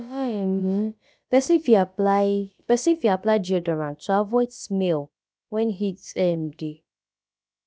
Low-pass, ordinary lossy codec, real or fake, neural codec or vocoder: none; none; fake; codec, 16 kHz, about 1 kbps, DyCAST, with the encoder's durations